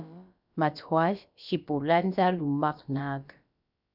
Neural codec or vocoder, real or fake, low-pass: codec, 16 kHz, about 1 kbps, DyCAST, with the encoder's durations; fake; 5.4 kHz